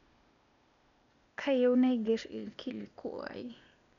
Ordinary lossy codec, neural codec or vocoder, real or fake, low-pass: none; codec, 16 kHz, 0.8 kbps, ZipCodec; fake; 7.2 kHz